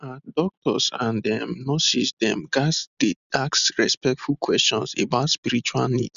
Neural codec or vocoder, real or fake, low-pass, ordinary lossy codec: none; real; 7.2 kHz; none